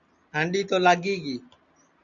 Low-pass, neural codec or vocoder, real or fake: 7.2 kHz; none; real